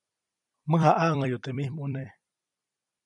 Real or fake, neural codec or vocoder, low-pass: fake; vocoder, 44.1 kHz, 128 mel bands every 256 samples, BigVGAN v2; 10.8 kHz